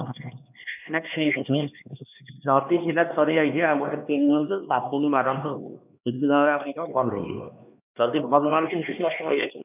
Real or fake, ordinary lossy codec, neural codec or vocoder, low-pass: fake; none; codec, 16 kHz, 2 kbps, X-Codec, HuBERT features, trained on LibriSpeech; 3.6 kHz